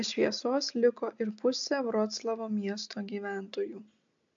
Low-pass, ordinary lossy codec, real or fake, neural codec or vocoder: 7.2 kHz; AAC, 64 kbps; real; none